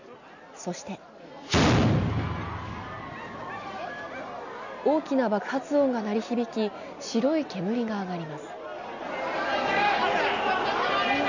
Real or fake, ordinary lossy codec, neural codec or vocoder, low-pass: real; none; none; 7.2 kHz